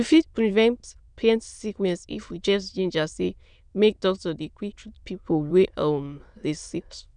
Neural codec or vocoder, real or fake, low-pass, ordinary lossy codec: autoencoder, 22.05 kHz, a latent of 192 numbers a frame, VITS, trained on many speakers; fake; 9.9 kHz; none